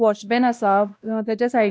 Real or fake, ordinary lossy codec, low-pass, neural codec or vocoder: fake; none; none; codec, 16 kHz, 1 kbps, X-Codec, WavLM features, trained on Multilingual LibriSpeech